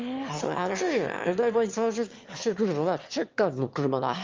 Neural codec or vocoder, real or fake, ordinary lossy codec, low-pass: autoencoder, 22.05 kHz, a latent of 192 numbers a frame, VITS, trained on one speaker; fake; Opus, 32 kbps; 7.2 kHz